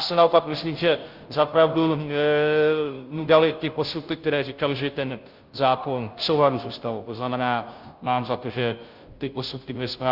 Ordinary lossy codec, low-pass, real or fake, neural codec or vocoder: Opus, 24 kbps; 5.4 kHz; fake; codec, 16 kHz, 0.5 kbps, FunCodec, trained on Chinese and English, 25 frames a second